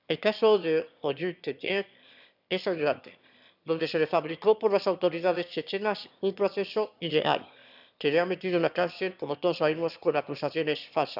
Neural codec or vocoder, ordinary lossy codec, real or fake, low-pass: autoencoder, 22.05 kHz, a latent of 192 numbers a frame, VITS, trained on one speaker; none; fake; 5.4 kHz